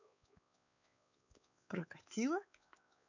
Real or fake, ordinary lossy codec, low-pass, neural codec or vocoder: fake; none; 7.2 kHz; codec, 16 kHz, 4 kbps, X-Codec, WavLM features, trained on Multilingual LibriSpeech